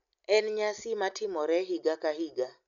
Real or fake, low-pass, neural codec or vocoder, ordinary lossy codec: real; 7.2 kHz; none; none